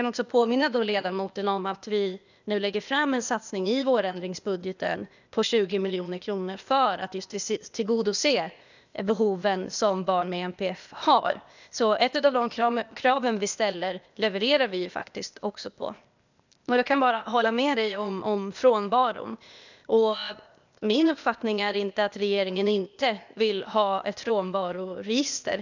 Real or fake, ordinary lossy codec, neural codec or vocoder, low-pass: fake; none; codec, 16 kHz, 0.8 kbps, ZipCodec; 7.2 kHz